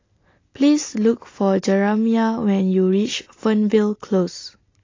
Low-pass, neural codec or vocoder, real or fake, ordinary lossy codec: 7.2 kHz; none; real; AAC, 32 kbps